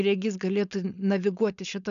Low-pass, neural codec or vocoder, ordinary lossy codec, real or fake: 7.2 kHz; codec, 16 kHz, 4.8 kbps, FACodec; MP3, 96 kbps; fake